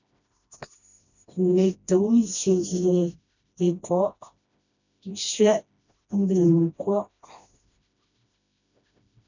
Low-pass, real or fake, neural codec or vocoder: 7.2 kHz; fake; codec, 16 kHz, 1 kbps, FreqCodec, smaller model